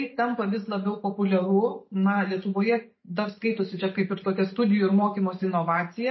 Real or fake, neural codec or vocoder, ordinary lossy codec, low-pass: real; none; MP3, 24 kbps; 7.2 kHz